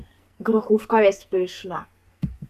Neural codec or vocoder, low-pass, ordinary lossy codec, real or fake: codec, 44.1 kHz, 2.6 kbps, SNAC; 14.4 kHz; MP3, 96 kbps; fake